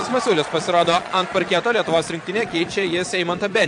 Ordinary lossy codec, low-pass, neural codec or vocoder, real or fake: AAC, 64 kbps; 9.9 kHz; vocoder, 22.05 kHz, 80 mel bands, Vocos; fake